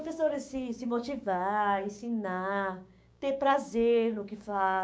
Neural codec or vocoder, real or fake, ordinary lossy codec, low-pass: codec, 16 kHz, 6 kbps, DAC; fake; none; none